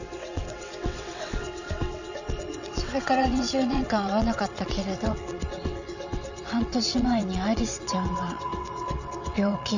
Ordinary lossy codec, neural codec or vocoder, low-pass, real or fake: none; vocoder, 22.05 kHz, 80 mel bands, WaveNeXt; 7.2 kHz; fake